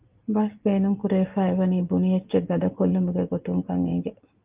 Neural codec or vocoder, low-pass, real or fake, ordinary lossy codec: none; 3.6 kHz; real; Opus, 24 kbps